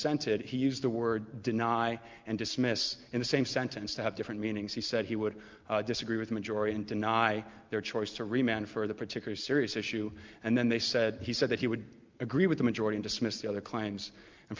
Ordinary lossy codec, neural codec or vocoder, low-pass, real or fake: Opus, 24 kbps; none; 7.2 kHz; real